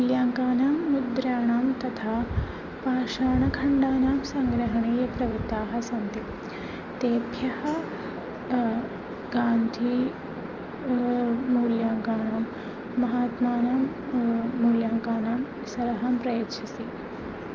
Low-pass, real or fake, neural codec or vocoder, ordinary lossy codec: 7.2 kHz; real; none; Opus, 32 kbps